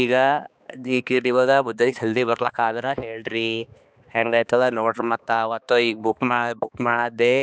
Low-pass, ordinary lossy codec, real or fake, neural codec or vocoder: none; none; fake; codec, 16 kHz, 2 kbps, X-Codec, HuBERT features, trained on balanced general audio